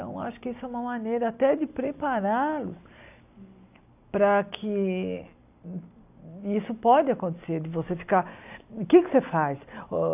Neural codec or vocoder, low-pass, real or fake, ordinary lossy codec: none; 3.6 kHz; real; AAC, 32 kbps